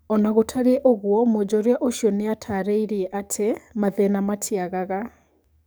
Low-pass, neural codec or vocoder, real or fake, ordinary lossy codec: none; codec, 44.1 kHz, 7.8 kbps, DAC; fake; none